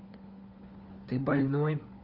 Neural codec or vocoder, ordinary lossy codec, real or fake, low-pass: codec, 16 kHz, 4 kbps, FunCodec, trained on LibriTTS, 50 frames a second; Opus, 24 kbps; fake; 5.4 kHz